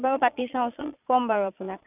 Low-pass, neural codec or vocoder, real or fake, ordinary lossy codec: 3.6 kHz; vocoder, 22.05 kHz, 80 mel bands, Vocos; fake; none